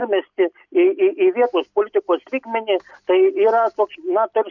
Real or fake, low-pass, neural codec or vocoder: real; 7.2 kHz; none